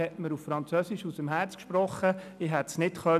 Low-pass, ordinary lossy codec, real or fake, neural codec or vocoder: 14.4 kHz; none; real; none